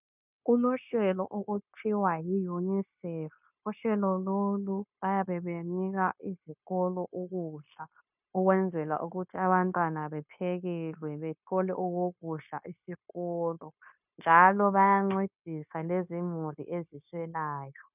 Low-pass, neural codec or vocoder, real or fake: 3.6 kHz; codec, 16 kHz, 0.9 kbps, LongCat-Audio-Codec; fake